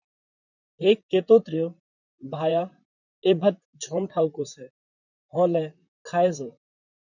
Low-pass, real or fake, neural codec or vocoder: 7.2 kHz; fake; vocoder, 44.1 kHz, 128 mel bands, Pupu-Vocoder